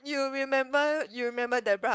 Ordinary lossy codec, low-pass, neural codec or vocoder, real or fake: none; none; codec, 16 kHz, 4.8 kbps, FACodec; fake